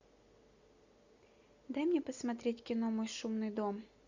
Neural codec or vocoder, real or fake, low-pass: none; real; 7.2 kHz